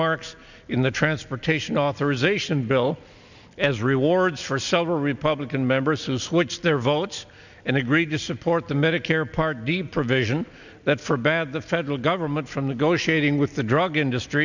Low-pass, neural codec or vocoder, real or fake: 7.2 kHz; none; real